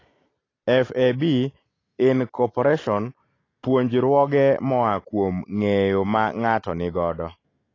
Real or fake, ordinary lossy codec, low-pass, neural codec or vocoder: real; AAC, 32 kbps; 7.2 kHz; none